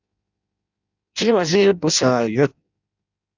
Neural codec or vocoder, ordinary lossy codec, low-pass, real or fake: codec, 16 kHz in and 24 kHz out, 0.6 kbps, FireRedTTS-2 codec; Opus, 64 kbps; 7.2 kHz; fake